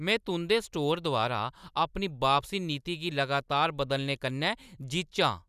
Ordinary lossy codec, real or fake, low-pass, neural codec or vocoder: none; real; 14.4 kHz; none